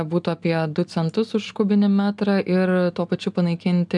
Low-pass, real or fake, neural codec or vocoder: 10.8 kHz; real; none